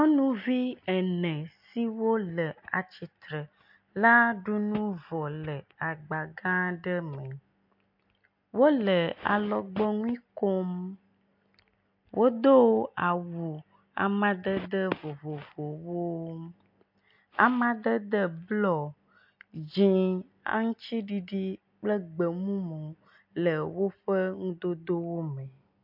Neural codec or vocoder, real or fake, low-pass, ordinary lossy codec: none; real; 5.4 kHz; MP3, 48 kbps